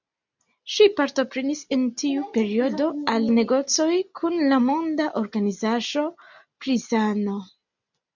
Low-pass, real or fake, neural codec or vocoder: 7.2 kHz; real; none